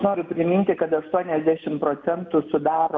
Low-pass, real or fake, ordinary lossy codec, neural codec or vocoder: 7.2 kHz; real; MP3, 64 kbps; none